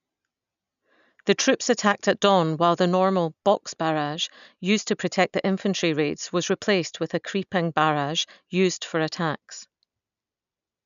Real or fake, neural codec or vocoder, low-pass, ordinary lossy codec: real; none; 7.2 kHz; none